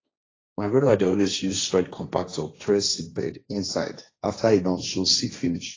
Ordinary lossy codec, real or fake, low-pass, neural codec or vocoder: AAC, 32 kbps; fake; 7.2 kHz; codec, 16 kHz, 1.1 kbps, Voila-Tokenizer